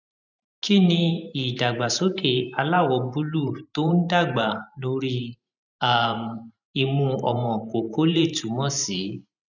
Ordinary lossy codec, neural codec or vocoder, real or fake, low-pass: none; vocoder, 44.1 kHz, 128 mel bands every 512 samples, BigVGAN v2; fake; 7.2 kHz